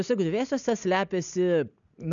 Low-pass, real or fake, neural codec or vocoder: 7.2 kHz; real; none